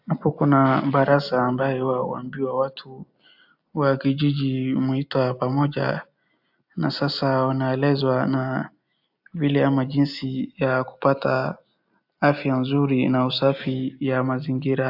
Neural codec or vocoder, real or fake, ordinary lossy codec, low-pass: none; real; AAC, 48 kbps; 5.4 kHz